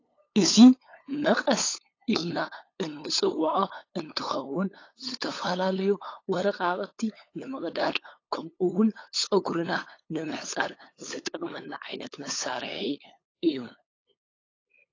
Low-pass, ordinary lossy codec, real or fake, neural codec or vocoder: 7.2 kHz; AAC, 32 kbps; fake; codec, 16 kHz, 8 kbps, FunCodec, trained on LibriTTS, 25 frames a second